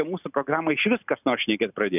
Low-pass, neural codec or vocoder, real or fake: 3.6 kHz; none; real